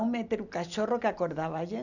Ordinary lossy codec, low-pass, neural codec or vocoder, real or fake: none; 7.2 kHz; none; real